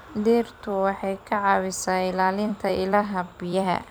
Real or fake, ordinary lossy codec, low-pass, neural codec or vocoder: fake; none; none; vocoder, 44.1 kHz, 128 mel bands every 256 samples, BigVGAN v2